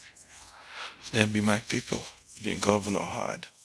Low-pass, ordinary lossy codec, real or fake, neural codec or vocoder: none; none; fake; codec, 24 kHz, 0.5 kbps, DualCodec